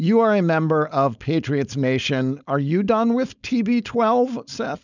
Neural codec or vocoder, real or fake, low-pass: codec, 16 kHz, 4.8 kbps, FACodec; fake; 7.2 kHz